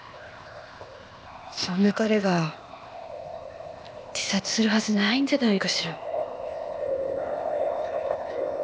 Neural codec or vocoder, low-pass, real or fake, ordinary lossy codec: codec, 16 kHz, 0.8 kbps, ZipCodec; none; fake; none